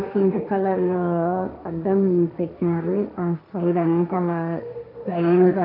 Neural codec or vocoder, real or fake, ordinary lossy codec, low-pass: codec, 16 kHz, 1.1 kbps, Voila-Tokenizer; fake; none; 5.4 kHz